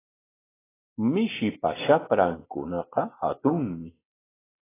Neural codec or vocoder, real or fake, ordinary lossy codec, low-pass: none; real; AAC, 16 kbps; 3.6 kHz